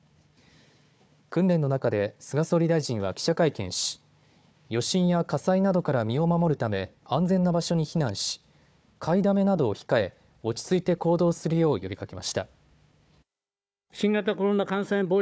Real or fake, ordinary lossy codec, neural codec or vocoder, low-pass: fake; none; codec, 16 kHz, 4 kbps, FunCodec, trained on Chinese and English, 50 frames a second; none